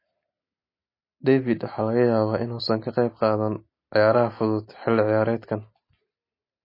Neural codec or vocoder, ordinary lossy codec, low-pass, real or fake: none; MP3, 24 kbps; 5.4 kHz; real